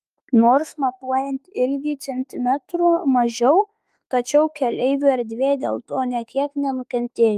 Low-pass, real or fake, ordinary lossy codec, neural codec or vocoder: 14.4 kHz; fake; Opus, 32 kbps; autoencoder, 48 kHz, 32 numbers a frame, DAC-VAE, trained on Japanese speech